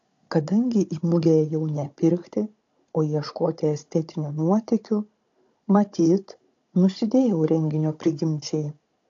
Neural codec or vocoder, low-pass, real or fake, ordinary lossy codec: codec, 16 kHz, 16 kbps, FunCodec, trained on Chinese and English, 50 frames a second; 7.2 kHz; fake; AAC, 48 kbps